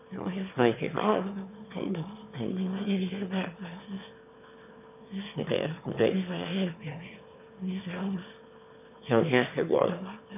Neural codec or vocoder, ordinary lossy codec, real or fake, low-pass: autoencoder, 22.05 kHz, a latent of 192 numbers a frame, VITS, trained on one speaker; none; fake; 3.6 kHz